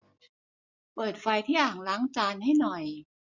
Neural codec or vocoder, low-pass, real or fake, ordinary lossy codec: none; 7.2 kHz; real; none